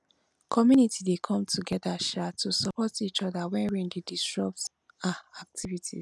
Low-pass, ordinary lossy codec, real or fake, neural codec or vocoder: none; none; real; none